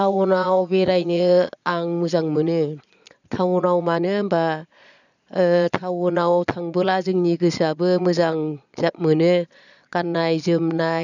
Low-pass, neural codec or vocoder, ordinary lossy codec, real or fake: 7.2 kHz; vocoder, 22.05 kHz, 80 mel bands, Vocos; none; fake